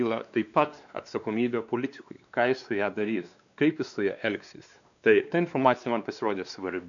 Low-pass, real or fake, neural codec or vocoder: 7.2 kHz; fake; codec, 16 kHz, 2 kbps, X-Codec, WavLM features, trained on Multilingual LibriSpeech